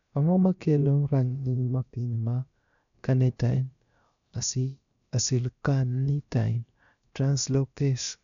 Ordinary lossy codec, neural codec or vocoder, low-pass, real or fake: none; codec, 16 kHz, about 1 kbps, DyCAST, with the encoder's durations; 7.2 kHz; fake